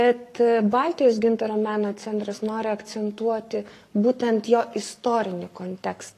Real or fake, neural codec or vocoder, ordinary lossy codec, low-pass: fake; codec, 44.1 kHz, 7.8 kbps, Pupu-Codec; AAC, 48 kbps; 14.4 kHz